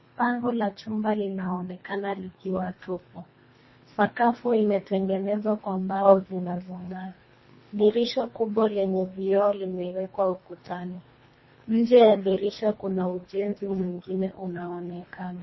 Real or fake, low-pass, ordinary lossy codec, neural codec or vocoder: fake; 7.2 kHz; MP3, 24 kbps; codec, 24 kHz, 1.5 kbps, HILCodec